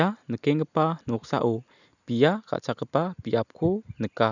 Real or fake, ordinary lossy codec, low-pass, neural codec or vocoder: real; none; 7.2 kHz; none